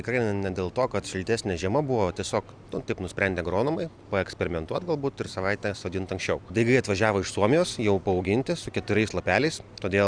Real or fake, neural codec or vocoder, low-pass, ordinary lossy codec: real; none; 9.9 kHz; Opus, 64 kbps